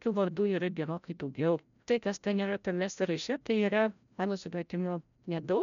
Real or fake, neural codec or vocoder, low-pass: fake; codec, 16 kHz, 0.5 kbps, FreqCodec, larger model; 7.2 kHz